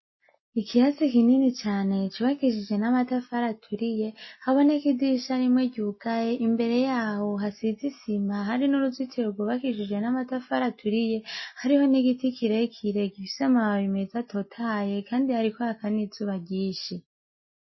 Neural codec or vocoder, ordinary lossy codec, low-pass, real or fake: none; MP3, 24 kbps; 7.2 kHz; real